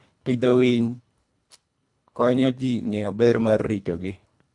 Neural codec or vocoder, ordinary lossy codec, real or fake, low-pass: codec, 24 kHz, 1.5 kbps, HILCodec; none; fake; 10.8 kHz